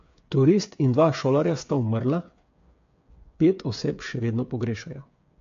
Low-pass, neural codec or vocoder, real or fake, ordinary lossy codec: 7.2 kHz; codec, 16 kHz, 8 kbps, FreqCodec, smaller model; fake; AAC, 48 kbps